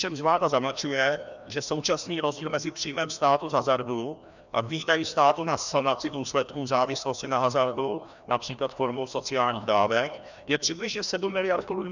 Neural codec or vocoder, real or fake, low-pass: codec, 16 kHz, 1 kbps, FreqCodec, larger model; fake; 7.2 kHz